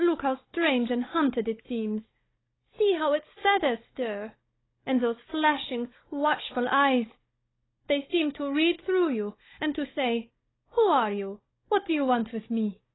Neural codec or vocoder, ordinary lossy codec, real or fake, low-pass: codec, 16 kHz, 16 kbps, FunCodec, trained on Chinese and English, 50 frames a second; AAC, 16 kbps; fake; 7.2 kHz